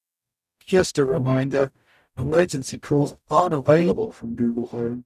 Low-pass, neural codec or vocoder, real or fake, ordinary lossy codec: 14.4 kHz; codec, 44.1 kHz, 0.9 kbps, DAC; fake; none